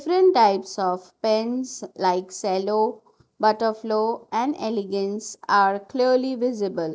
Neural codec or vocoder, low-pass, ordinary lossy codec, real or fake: none; none; none; real